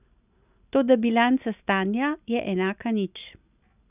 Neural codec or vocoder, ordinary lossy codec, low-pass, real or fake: none; none; 3.6 kHz; real